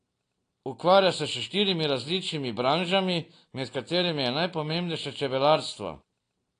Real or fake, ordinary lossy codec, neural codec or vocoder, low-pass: real; AAC, 48 kbps; none; 9.9 kHz